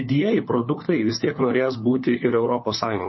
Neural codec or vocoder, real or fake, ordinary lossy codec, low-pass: codec, 16 kHz, 4 kbps, FunCodec, trained on LibriTTS, 50 frames a second; fake; MP3, 24 kbps; 7.2 kHz